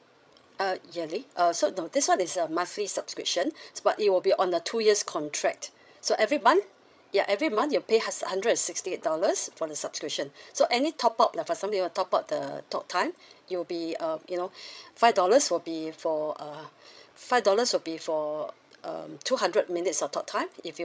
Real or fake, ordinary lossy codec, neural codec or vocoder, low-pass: fake; none; codec, 16 kHz, 16 kbps, FreqCodec, larger model; none